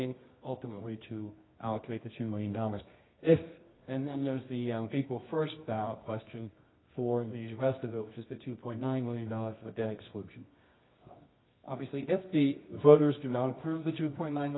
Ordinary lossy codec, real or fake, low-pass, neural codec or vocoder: AAC, 16 kbps; fake; 7.2 kHz; codec, 24 kHz, 0.9 kbps, WavTokenizer, medium music audio release